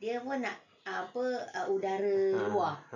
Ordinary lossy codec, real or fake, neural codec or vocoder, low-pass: none; real; none; 7.2 kHz